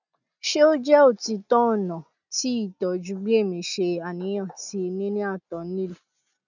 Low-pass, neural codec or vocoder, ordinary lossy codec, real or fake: 7.2 kHz; none; none; real